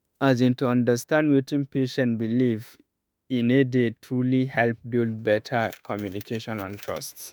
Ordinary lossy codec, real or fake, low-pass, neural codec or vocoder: none; fake; none; autoencoder, 48 kHz, 32 numbers a frame, DAC-VAE, trained on Japanese speech